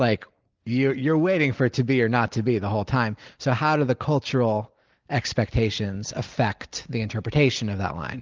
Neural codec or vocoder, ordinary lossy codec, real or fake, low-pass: none; Opus, 32 kbps; real; 7.2 kHz